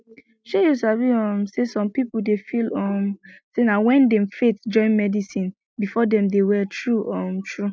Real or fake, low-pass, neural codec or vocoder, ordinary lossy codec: real; none; none; none